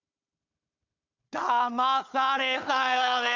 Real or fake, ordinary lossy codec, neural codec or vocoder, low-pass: fake; none; codec, 16 kHz, 4 kbps, FunCodec, trained on Chinese and English, 50 frames a second; 7.2 kHz